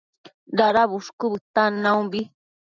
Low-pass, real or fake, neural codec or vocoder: 7.2 kHz; real; none